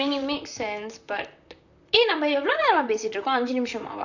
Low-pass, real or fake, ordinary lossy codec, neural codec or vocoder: 7.2 kHz; fake; none; vocoder, 44.1 kHz, 128 mel bands, Pupu-Vocoder